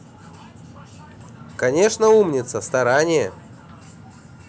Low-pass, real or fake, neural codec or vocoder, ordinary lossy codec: none; real; none; none